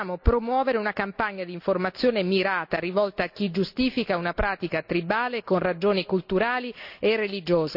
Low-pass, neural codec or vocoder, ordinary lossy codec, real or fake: 5.4 kHz; none; none; real